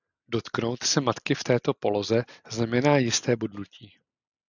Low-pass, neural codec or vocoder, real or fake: 7.2 kHz; none; real